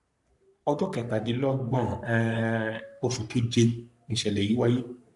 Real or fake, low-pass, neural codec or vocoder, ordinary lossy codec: fake; 10.8 kHz; codec, 44.1 kHz, 3.4 kbps, Pupu-Codec; none